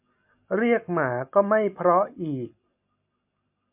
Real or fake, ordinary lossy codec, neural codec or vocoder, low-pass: real; MP3, 32 kbps; none; 3.6 kHz